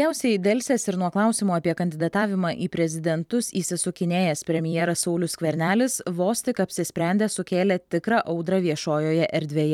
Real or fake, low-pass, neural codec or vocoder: fake; 19.8 kHz; vocoder, 44.1 kHz, 128 mel bands every 256 samples, BigVGAN v2